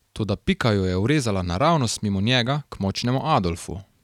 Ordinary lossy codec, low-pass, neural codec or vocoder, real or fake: none; 19.8 kHz; none; real